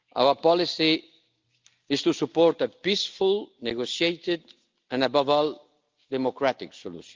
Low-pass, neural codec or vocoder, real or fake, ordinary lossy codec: 7.2 kHz; none; real; Opus, 24 kbps